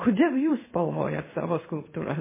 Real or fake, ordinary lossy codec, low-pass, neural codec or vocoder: fake; MP3, 16 kbps; 3.6 kHz; codec, 16 kHz in and 24 kHz out, 1 kbps, XY-Tokenizer